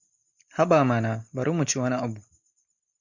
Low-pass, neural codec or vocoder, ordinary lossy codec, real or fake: 7.2 kHz; none; MP3, 64 kbps; real